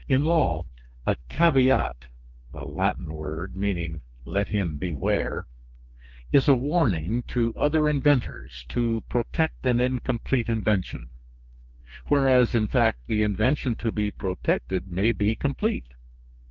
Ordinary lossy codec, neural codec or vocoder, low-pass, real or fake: Opus, 32 kbps; codec, 32 kHz, 1.9 kbps, SNAC; 7.2 kHz; fake